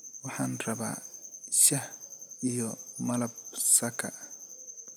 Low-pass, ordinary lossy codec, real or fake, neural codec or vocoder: none; none; real; none